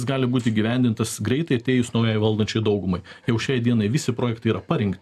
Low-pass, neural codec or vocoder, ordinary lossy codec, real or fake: 14.4 kHz; none; AAC, 96 kbps; real